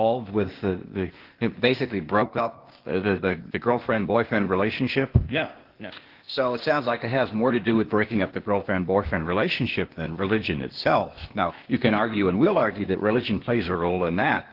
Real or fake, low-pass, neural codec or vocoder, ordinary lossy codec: fake; 5.4 kHz; codec, 16 kHz, 0.8 kbps, ZipCodec; Opus, 16 kbps